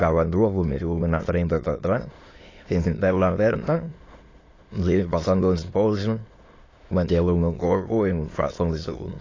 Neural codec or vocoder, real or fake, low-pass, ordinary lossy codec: autoencoder, 22.05 kHz, a latent of 192 numbers a frame, VITS, trained on many speakers; fake; 7.2 kHz; AAC, 32 kbps